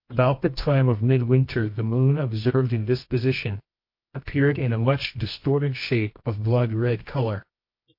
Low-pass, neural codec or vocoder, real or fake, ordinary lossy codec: 5.4 kHz; codec, 24 kHz, 0.9 kbps, WavTokenizer, medium music audio release; fake; MP3, 32 kbps